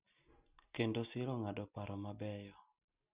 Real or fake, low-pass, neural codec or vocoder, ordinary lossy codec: real; 3.6 kHz; none; Opus, 64 kbps